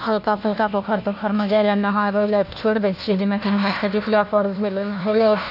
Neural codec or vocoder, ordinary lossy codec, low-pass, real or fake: codec, 16 kHz, 1 kbps, FunCodec, trained on LibriTTS, 50 frames a second; none; 5.4 kHz; fake